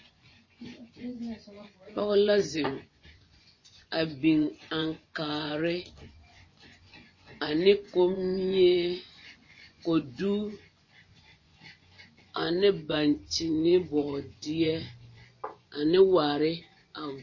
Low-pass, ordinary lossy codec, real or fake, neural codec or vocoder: 7.2 kHz; MP3, 32 kbps; fake; vocoder, 24 kHz, 100 mel bands, Vocos